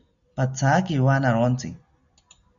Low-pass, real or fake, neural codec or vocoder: 7.2 kHz; real; none